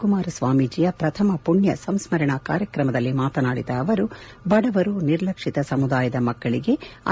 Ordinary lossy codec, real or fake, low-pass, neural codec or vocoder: none; real; none; none